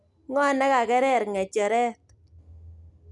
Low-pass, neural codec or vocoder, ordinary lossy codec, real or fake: 10.8 kHz; none; none; real